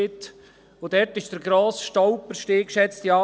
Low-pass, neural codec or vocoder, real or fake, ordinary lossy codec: none; none; real; none